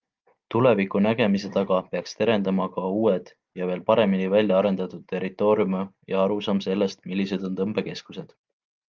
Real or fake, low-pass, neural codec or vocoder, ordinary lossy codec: real; 7.2 kHz; none; Opus, 32 kbps